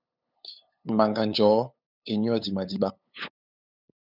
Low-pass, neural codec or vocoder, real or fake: 5.4 kHz; codec, 16 kHz, 8 kbps, FunCodec, trained on LibriTTS, 25 frames a second; fake